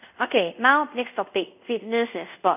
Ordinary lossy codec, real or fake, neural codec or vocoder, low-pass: none; fake; codec, 24 kHz, 0.5 kbps, DualCodec; 3.6 kHz